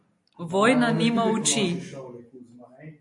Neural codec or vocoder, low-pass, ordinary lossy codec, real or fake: none; 10.8 kHz; MP3, 48 kbps; real